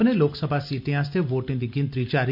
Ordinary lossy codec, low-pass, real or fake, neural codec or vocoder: none; 5.4 kHz; real; none